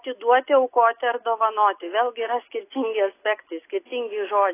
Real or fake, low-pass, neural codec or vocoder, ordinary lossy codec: real; 3.6 kHz; none; AAC, 24 kbps